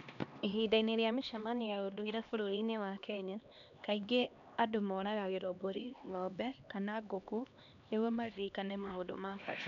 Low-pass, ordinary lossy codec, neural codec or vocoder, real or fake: 7.2 kHz; none; codec, 16 kHz, 2 kbps, X-Codec, HuBERT features, trained on LibriSpeech; fake